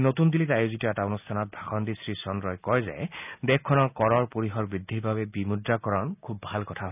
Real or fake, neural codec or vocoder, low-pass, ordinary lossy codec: real; none; 3.6 kHz; none